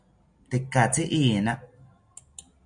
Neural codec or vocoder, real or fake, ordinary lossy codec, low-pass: none; real; MP3, 96 kbps; 9.9 kHz